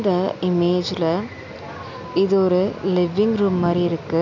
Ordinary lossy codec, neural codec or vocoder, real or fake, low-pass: none; none; real; 7.2 kHz